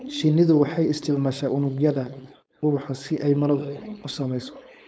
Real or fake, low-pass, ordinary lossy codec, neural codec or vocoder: fake; none; none; codec, 16 kHz, 4.8 kbps, FACodec